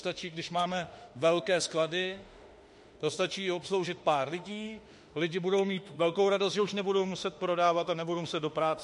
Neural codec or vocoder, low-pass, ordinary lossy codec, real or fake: autoencoder, 48 kHz, 32 numbers a frame, DAC-VAE, trained on Japanese speech; 14.4 kHz; MP3, 48 kbps; fake